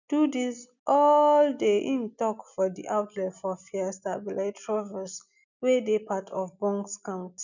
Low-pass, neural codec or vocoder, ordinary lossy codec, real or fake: 7.2 kHz; none; none; real